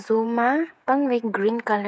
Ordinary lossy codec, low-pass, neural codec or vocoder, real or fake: none; none; codec, 16 kHz, 8 kbps, FreqCodec, smaller model; fake